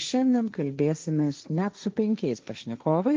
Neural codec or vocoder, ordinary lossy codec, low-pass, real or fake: codec, 16 kHz, 1.1 kbps, Voila-Tokenizer; Opus, 24 kbps; 7.2 kHz; fake